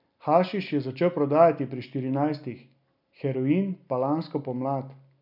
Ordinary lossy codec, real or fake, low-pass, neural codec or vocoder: none; real; 5.4 kHz; none